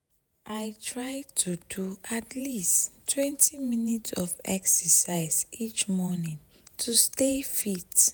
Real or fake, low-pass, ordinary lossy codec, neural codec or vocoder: fake; none; none; vocoder, 48 kHz, 128 mel bands, Vocos